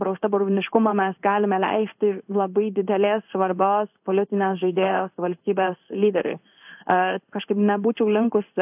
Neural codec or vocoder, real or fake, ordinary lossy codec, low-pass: codec, 16 kHz in and 24 kHz out, 1 kbps, XY-Tokenizer; fake; AAC, 32 kbps; 3.6 kHz